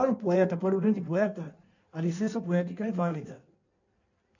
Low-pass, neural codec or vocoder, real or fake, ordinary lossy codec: 7.2 kHz; codec, 16 kHz in and 24 kHz out, 1.1 kbps, FireRedTTS-2 codec; fake; none